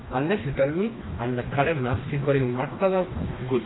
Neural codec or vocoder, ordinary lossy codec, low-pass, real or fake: codec, 16 kHz, 2 kbps, FreqCodec, smaller model; AAC, 16 kbps; 7.2 kHz; fake